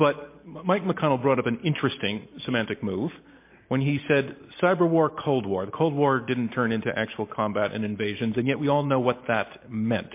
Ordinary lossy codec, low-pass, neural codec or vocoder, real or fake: MP3, 24 kbps; 3.6 kHz; none; real